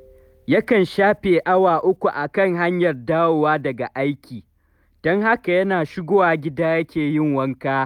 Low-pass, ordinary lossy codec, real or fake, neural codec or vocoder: 19.8 kHz; none; real; none